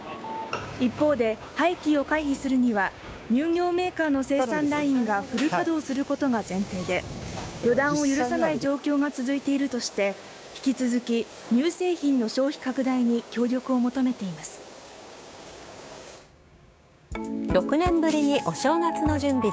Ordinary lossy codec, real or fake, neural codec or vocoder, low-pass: none; fake; codec, 16 kHz, 6 kbps, DAC; none